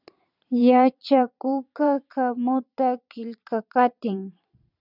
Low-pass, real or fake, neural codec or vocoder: 5.4 kHz; fake; vocoder, 22.05 kHz, 80 mel bands, Vocos